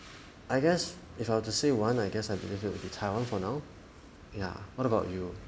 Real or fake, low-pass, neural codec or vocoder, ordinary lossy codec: real; none; none; none